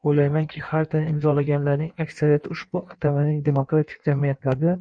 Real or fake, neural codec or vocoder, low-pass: fake; codec, 16 kHz in and 24 kHz out, 1.1 kbps, FireRedTTS-2 codec; 9.9 kHz